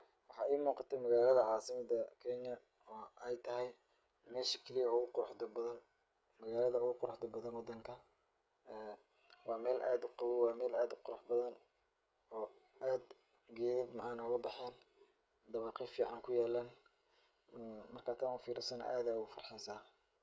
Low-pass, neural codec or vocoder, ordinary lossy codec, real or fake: none; codec, 16 kHz, 16 kbps, FreqCodec, smaller model; none; fake